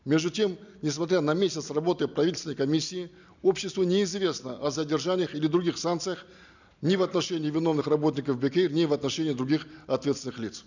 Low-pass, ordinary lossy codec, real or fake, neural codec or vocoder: 7.2 kHz; none; real; none